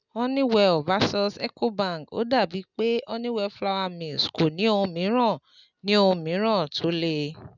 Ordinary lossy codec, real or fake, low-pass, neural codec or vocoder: none; real; 7.2 kHz; none